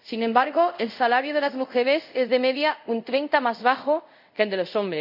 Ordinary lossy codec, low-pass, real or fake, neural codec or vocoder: none; 5.4 kHz; fake; codec, 24 kHz, 0.5 kbps, DualCodec